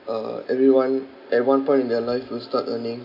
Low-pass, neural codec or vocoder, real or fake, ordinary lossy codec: 5.4 kHz; none; real; none